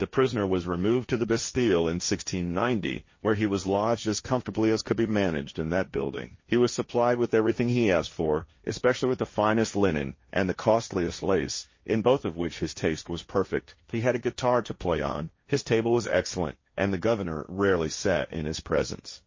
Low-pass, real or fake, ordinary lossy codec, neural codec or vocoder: 7.2 kHz; fake; MP3, 32 kbps; codec, 16 kHz, 1.1 kbps, Voila-Tokenizer